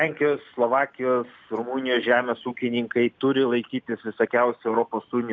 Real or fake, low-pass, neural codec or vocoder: real; 7.2 kHz; none